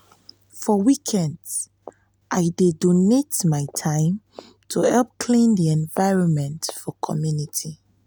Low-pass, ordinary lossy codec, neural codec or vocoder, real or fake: none; none; none; real